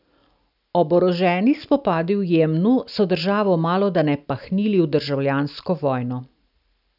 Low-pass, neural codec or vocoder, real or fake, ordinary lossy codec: 5.4 kHz; none; real; none